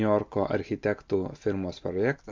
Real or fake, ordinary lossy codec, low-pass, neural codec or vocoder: real; MP3, 64 kbps; 7.2 kHz; none